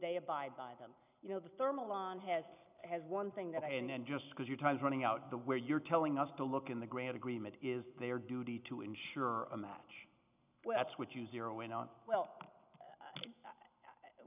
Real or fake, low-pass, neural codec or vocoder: real; 3.6 kHz; none